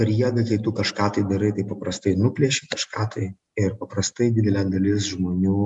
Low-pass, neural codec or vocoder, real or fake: 10.8 kHz; none; real